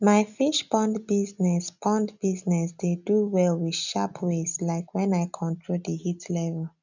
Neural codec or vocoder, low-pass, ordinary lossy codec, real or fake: none; 7.2 kHz; none; real